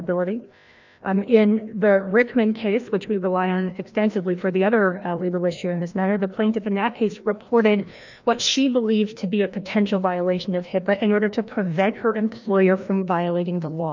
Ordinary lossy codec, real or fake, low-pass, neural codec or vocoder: MP3, 64 kbps; fake; 7.2 kHz; codec, 16 kHz, 1 kbps, FreqCodec, larger model